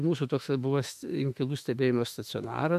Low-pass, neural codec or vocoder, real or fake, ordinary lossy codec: 14.4 kHz; autoencoder, 48 kHz, 32 numbers a frame, DAC-VAE, trained on Japanese speech; fake; AAC, 96 kbps